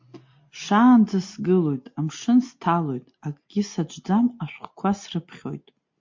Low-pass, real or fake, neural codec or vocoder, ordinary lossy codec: 7.2 kHz; real; none; MP3, 48 kbps